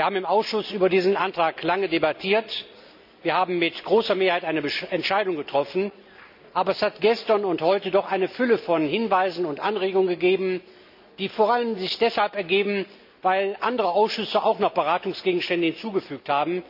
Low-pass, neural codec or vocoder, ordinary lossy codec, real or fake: 5.4 kHz; none; none; real